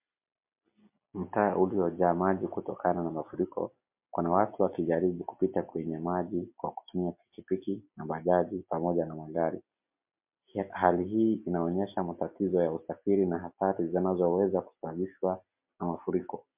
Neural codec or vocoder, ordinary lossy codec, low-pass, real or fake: none; MP3, 32 kbps; 3.6 kHz; real